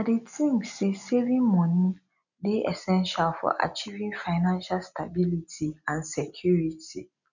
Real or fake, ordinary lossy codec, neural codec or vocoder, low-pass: real; none; none; 7.2 kHz